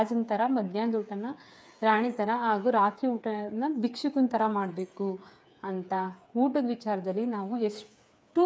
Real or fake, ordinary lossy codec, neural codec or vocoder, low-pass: fake; none; codec, 16 kHz, 8 kbps, FreqCodec, smaller model; none